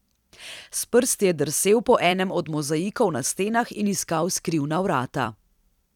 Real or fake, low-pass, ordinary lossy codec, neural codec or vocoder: fake; 19.8 kHz; none; vocoder, 44.1 kHz, 128 mel bands every 256 samples, BigVGAN v2